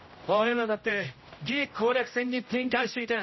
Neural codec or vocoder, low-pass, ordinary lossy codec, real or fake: codec, 16 kHz, 0.5 kbps, X-Codec, HuBERT features, trained on general audio; 7.2 kHz; MP3, 24 kbps; fake